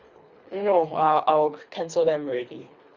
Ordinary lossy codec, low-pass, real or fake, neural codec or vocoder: Opus, 64 kbps; 7.2 kHz; fake; codec, 24 kHz, 3 kbps, HILCodec